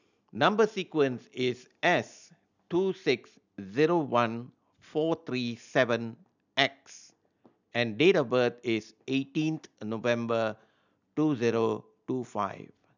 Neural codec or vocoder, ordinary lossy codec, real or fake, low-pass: none; none; real; 7.2 kHz